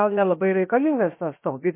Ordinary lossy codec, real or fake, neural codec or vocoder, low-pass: MP3, 24 kbps; fake; codec, 16 kHz, 0.7 kbps, FocalCodec; 3.6 kHz